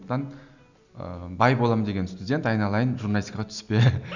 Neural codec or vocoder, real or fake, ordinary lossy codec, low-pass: none; real; none; 7.2 kHz